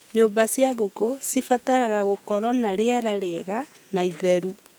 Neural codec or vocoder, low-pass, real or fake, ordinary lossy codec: codec, 44.1 kHz, 2.6 kbps, SNAC; none; fake; none